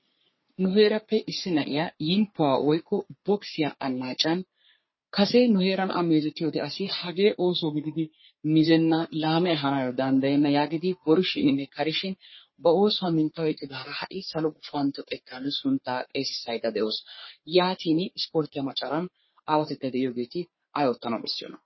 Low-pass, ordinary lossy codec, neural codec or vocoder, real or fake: 7.2 kHz; MP3, 24 kbps; codec, 44.1 kHz, 3.4 kbps, Pupu-Codec; fake